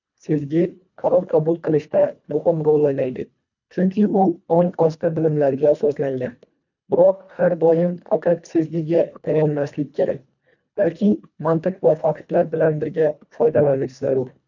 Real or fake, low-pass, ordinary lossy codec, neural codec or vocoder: fake; 7.2 kHz; none; codec, 24 kHz, 1.5 kbps, HILCodec